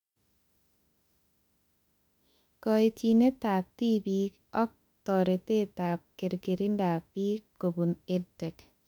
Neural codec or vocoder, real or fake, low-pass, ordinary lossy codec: autoencoder, 48 kHz, 32 numbers a frame, DAC-VAE, trained on Japanese speech; fake; 19.8 kHz; none